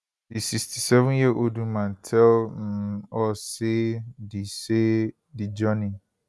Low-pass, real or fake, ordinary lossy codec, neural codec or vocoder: none; real; none; none